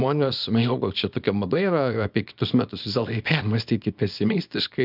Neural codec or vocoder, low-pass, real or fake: codec, 24 kHz, 0.9 kbps, WavTokenizer, small release; 5.4 kHz; fake